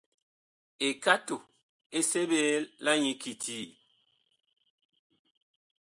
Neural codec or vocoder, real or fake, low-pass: none; real; 10.8 kHz